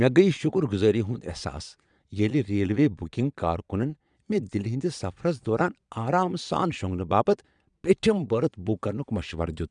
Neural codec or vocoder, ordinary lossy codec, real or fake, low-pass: vocoder, 22.05 kHz, 80 mel bands, Vocos; none; fake; 9.9 kHz